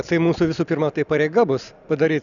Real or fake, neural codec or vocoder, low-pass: real; none; 7.2 kHz